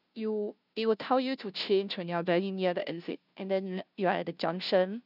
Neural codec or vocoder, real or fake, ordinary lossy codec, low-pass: codec, 16 kHz, 0.5 kbps, FunCodec, trained on Chinese and English, 25 frames a second; fake; none; 5.4 kHz